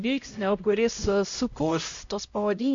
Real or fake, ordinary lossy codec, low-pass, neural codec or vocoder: fake; MP3, 48 kbps; 7.2 kHz; codec, 16 kHz, 0.5 kbps, X-Codec, HuBERT features, trained on LibriSpeech